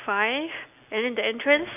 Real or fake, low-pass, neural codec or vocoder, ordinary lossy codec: real; 3.6 kHz; none; none